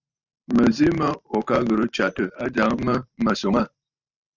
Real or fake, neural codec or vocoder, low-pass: fake; vocoder, 44.1 kHz, 128 mel bands every 512 samples, BigVGAN v2; 7.2 kHz